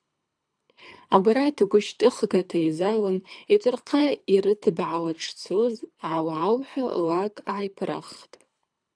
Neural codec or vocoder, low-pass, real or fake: codec, 24 kHz, 3 kbps, HILCodec; 9.9 kHz; fake